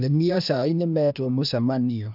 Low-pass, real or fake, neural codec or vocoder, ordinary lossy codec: 5.4 kHz; fake; codec, 16 kHz, 0.8 kbps, ZipCodec; none